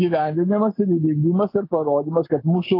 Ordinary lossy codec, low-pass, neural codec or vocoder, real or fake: AAC, 32 kbps; 5.4 kHz; none; real